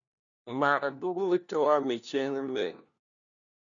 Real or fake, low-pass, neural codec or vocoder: fake; 7.2 kHz; codec, 16 kHz, 1 kbps, FunCodec, trained on LibriTTS, 50 frames a second